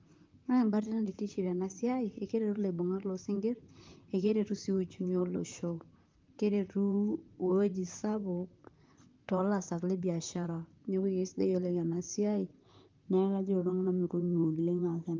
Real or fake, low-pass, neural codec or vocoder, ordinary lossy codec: fake; 7.2 kHz; vocoder, 44.1 kHz, 128 mel bands, Pupu-Vocoder; Opus, 24 kbps